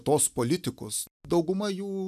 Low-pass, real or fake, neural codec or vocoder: 14.4 kHz; real; none